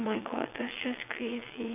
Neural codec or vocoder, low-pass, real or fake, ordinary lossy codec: vocoder, 22.05 kHz, 80 mel bands, WaveNeXt; 3.6 kHz; fake; none